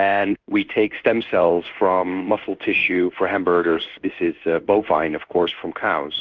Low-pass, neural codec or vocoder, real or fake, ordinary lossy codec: 7.2 kHz; codec, 16 kHz in and 24 kHz out, 1 kbps, XY-Tokenizer; fake; Opus, 32 kbps